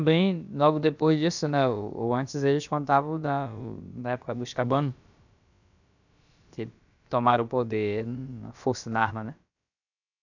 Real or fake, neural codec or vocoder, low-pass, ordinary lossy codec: fake; codec, 16 kHz, about 1 kbps, DyCAST, with the encoder's durations; 7.2 kHz; none